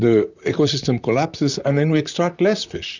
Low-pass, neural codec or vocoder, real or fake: 7.2 kHz; vocoder, 44.1 kHz, 128 mel bands, Pupu-Vocoder; fake